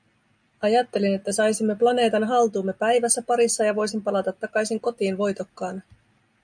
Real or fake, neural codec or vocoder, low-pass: real; none; 9.9 kHz